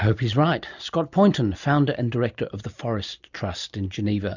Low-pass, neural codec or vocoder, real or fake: 7.2 kHz; none; real